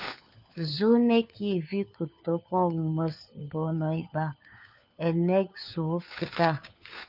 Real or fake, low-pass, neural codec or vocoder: fake; 5.4 kHz; codec, 16 kHz, 4 kbps, FunCodec, trained on LibriTTS, 50 frames a second